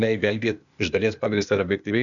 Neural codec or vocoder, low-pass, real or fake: codec, 16 kHz, 0.8 kbps, ZipCodec; 7.2 kHz; fake